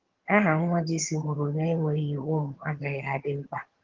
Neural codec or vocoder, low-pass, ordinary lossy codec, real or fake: vocoder, 22.05 kHz, 80 mel bands, HiFi-GAN; 7.2 kHz; Opus, 16 kbps; fake